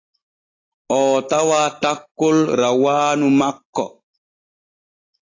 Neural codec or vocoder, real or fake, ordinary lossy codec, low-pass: none; real; AAC, 32 kbps; 7.2 kHz